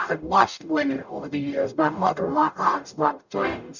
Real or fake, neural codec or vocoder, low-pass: fake; codec, 44.1 kHz, 0.9 kbps, DAC; 7.2 kHz